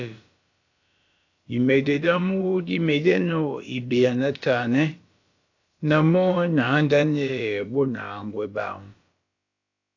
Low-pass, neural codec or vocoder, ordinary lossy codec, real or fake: 7.2 kHz; codec, 16 kHz, about 1 kbps, DyCAST, with the encoder's durations; AAC, 48 kbps; fake